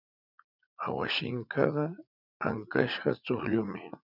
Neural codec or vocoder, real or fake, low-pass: vocoder, 44.1 kHz, 80 mel bands, Vocos; fake; 5.4 kHz